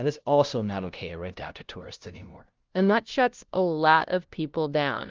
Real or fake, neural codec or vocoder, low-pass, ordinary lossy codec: fake; codec, 16 kHz, 0.5 kbps, FunCodec, trained on LibriTTS, 25 frames a second; 7.2 kHz; Opus, 24 kbps